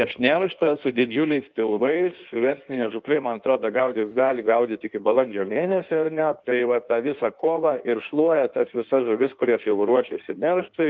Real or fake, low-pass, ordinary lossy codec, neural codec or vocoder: fake; 7.2 kHz; Opus, 24 kbps; codec, 16 kHz in and 24 kHz out, 1.1 kbps, FireRedTTS-2 codec